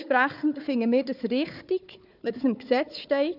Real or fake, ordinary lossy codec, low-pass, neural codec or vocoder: fake; none; 5.4 kHz; codec, 16 kHz, 4 kbps, FunCodec, trained on Chinese and English, 50 frames a second